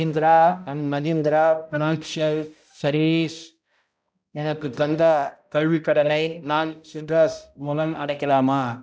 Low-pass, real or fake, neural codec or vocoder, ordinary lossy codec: none; fake; codec, 16 kHz, 0.5 kbps, X-Codec, HuBERT features, trained on balanced general audio; none